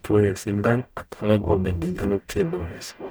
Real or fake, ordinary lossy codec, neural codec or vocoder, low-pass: fake; none; codec, 44.1 kHz, 0.9 kbps, DAC; none